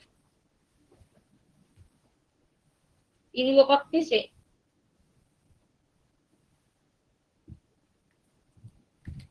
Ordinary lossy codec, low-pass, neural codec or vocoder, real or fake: Opus, 16 kbps; 10.8 kHz; codec, 24 kHz, 0.9 kbps, WavTokenizer, medium speech release version 1; fake